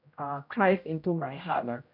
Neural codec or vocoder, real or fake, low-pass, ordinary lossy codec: codec, 16 kHz, 0.5 kbps, X-Codec, HuBERT features, trained on general audio; fake; 5.4 kHz; none